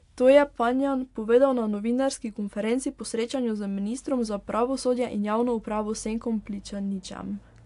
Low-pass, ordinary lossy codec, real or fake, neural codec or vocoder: 10.8 kHz; AAC, 64 kbps; real; none